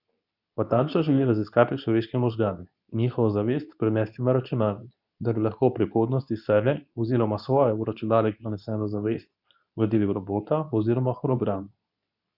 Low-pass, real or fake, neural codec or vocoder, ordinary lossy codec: 5.4 kHz; fake; codec, 24 kHz, 0.9 kbps, WavTokenizer, medium speech release version 2; none